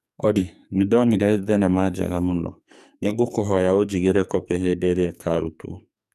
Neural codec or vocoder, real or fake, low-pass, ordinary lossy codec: codec, 44.1 kHz, 2.6 kbps, SNAC; fake; 14.4 kHz; none